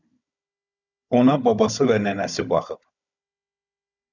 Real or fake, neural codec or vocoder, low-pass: fake; codec, 16 kHz, 16 kbps, FunCodec, trained on Chinese and English, 50 frames a second; 7.2 kHz